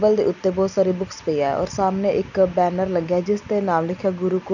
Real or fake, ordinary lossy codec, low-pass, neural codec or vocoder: real; none; 7.2 kHz; none